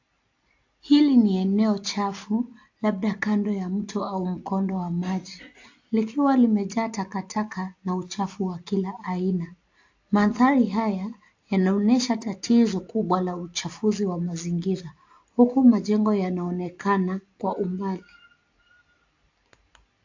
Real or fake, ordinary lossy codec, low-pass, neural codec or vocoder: real; AAC, 48 kbps; 7.2 kHz; none